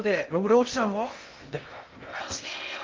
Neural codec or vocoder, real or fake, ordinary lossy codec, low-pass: codec, 16 kHz in and 24 kHz out, 0.6 kbps, FocalCodec, streaming, 2048 codes; fake; Opus, 32 kbps; 7.2 kHz